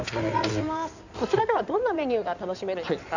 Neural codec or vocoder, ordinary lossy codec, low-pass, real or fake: codec, 16 kHz in and 24 kHz out, 2.2 kbps, FireRedTTS-2 codec; none; 7.2 kHz; fake